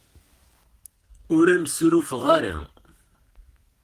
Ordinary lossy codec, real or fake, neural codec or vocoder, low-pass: Opus, 24 kbps; fake; codec, 32 kHz, 1.9 kbps, SNAC; 14.4 kHz